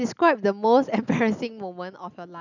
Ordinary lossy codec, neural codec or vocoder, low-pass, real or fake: none; none; 7.2 kHz; real